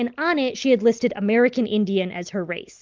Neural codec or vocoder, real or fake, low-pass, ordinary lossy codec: none; real; 7.2 kHz; Opus, 32 kbps